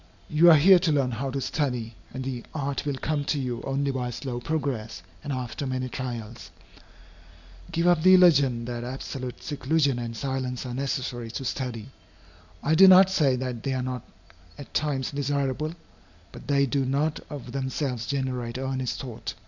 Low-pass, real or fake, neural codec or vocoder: 7.2 kHz; real; none